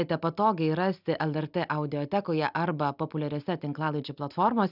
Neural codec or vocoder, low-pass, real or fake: none; 5.4 kHz; real